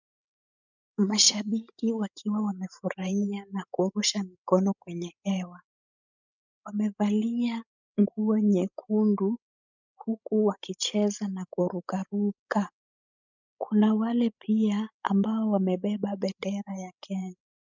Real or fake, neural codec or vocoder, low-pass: fake; codec, 16 kHz, 16 kbps, FreqCodec, larger model; 7.2 kHz